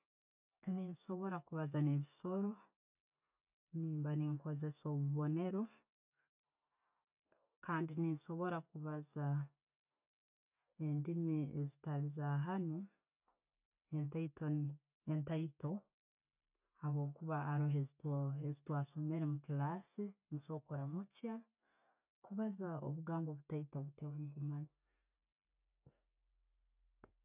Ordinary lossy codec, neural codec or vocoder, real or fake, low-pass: none; none; real; 3.6 kHz